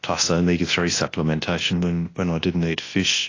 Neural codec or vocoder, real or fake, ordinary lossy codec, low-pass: codec, 24 kHz, 0.9 kbps, WavTokenizer, large speech release; fake; AAC, 32 kbps; 7.2 kHz